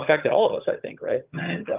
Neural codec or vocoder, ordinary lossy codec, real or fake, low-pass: vocoder, 22.05 kHz, 80 mel bands, HiFi-GAN; Opus, 32 kbps; fake; 3.6 kHz